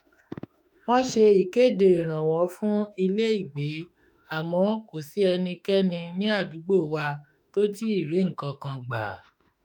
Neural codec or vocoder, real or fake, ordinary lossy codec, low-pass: autoencoder, 48 kHz, 32 numbers a frame, DAC-VAE, trained on Japanese speech; fake; none; 19.8 kHz